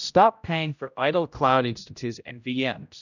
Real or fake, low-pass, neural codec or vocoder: fake; 7.2 kHz; codec, 16 kHz, 0.5 kbps, X-Codec, HuBERT features, trained on general audio